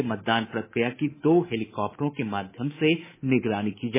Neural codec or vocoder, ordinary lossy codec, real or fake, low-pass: codec, 24 kHz, 3.1 kbps, DualCodec; MP3, 16 kbps; fake; 3.6 kHz